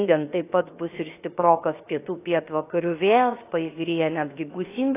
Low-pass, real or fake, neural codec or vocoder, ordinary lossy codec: 3.6 kHz; fake; codec, 16 kHz, about 1 kbps, DyCAST, with the encoder's durations; AAC, 24 kbps